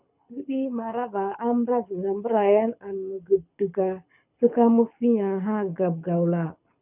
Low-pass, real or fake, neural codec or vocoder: 3.6 kHz; fake; codec, 24 kHz, 6 kbps, HILCodec